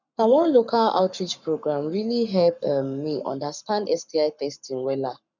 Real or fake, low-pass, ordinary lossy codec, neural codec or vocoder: fake; 7.2 kHz; none; codec, 44.1 kHz, 7.8 kbps, Pupu-Codec